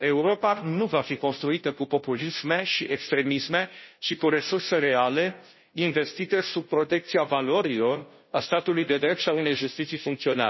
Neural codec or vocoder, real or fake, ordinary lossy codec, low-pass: codec, 16 kHz, 0.5 kbps, FunCodec, trained on Chinese and English, 25 frames a second; fake; MP3, 24 kbps; 7.2 kHz